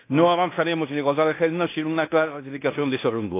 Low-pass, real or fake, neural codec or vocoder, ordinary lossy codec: 3.6 kHz; fake; codec, 16 kHz in and 24 kHz out, 0.9 kbps, LongCat-Audio-Codec, fine tuned four codebook decoder; AAC, 24 kbps